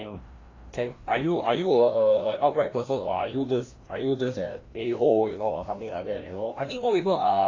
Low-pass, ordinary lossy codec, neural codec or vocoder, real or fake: 7.2 kHz; AAC, 32 kbps; codec, 16 kHz, 1 kbps, FreqCodec, larger model; fake